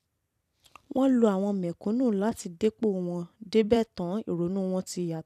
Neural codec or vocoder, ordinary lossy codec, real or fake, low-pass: vocoder, 44.1 kHz, 128 mel bands every 256 samples, BigVGAN v2; none; fake; 14.4 kHz